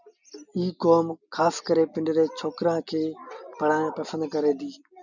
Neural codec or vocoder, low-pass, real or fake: none; 7.2 kHz; real